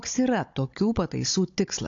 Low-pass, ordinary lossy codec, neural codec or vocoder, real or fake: 7.2 kHz; AAC, 48 kbps; codec, 16 kHz, 16 kbps, FunCodec, trained on Chinese and English, 50 frames a second; fake